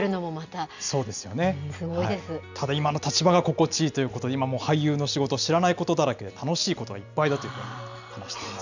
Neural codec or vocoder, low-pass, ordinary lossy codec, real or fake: none; 7.2 kHz; none; real